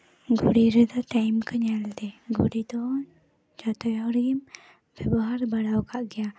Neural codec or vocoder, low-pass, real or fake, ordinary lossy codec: none; none; real; none